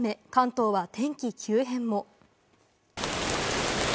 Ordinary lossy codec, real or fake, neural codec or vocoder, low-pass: none; real; none; none